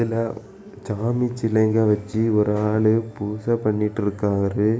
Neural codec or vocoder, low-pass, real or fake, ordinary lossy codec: none; none; real; none